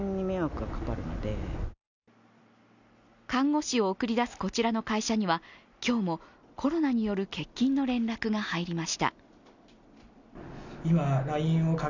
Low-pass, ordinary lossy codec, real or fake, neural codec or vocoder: 7.2 kHz; none; real; none